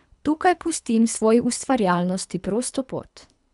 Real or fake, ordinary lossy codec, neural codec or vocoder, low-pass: fake; none; codec, 24 kHz, 3 kbps, HILCodec; 10.8 kHz